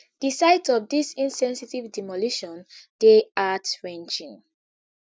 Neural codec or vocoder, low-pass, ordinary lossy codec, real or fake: none; none; none; real